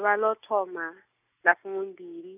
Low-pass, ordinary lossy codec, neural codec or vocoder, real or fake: 3.6 kHz; none; none; real